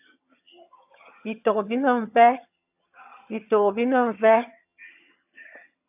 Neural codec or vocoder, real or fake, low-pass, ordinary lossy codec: vocoder, 22.05 kHz, 80 mel bands, HiFi-GAN; fake; 3.6 kHz; AAC, 32 kbps